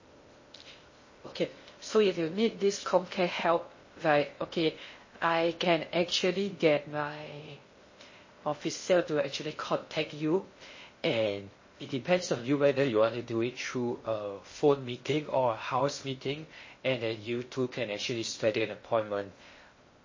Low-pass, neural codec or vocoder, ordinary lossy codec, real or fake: 7.2 kHz; codec, 16 kHz in and 24 kHz out, 0.6 kbps, FocalCodec, streaming, 2048 codes; MP3, 32 kbps; fake